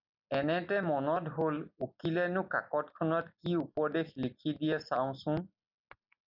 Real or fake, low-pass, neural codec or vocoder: real; 5.4 kHz; none